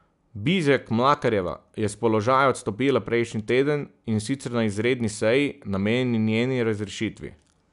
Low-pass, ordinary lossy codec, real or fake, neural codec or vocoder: 10.8 kHz; none; real; none